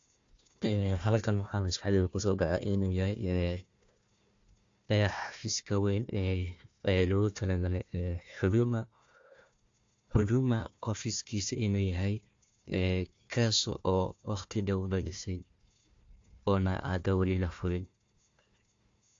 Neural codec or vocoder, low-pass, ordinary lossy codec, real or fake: codec, 16 kHz, 1 kbps, FunCodec, trained on Chinese and English, 50 frames a second; 7.2 kHz; AAC, 48 kbps; fake